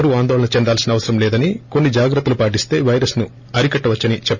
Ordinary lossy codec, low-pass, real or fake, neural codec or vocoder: none; 7.2 kHz; real; none